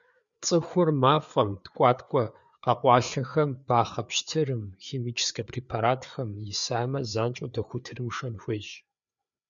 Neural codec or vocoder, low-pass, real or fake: codec, 16 kHz, 4 kbps, FreqCodec, larger model; 7.2 kHz; fake